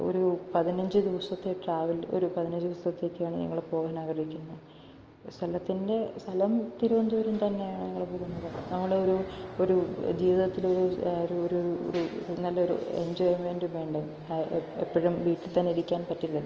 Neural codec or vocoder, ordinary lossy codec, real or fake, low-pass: none; Opus, 24 kbps; real; 7.2 kHz